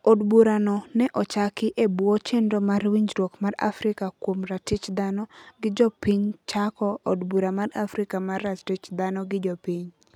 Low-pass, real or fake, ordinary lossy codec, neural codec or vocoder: 19.8 kHz; real; none; none